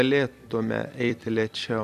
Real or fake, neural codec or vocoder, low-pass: fake; vocoder, 44.1 kHz, 128 mel bands every 256 samples, BigVGAN v2; 14.4 kHz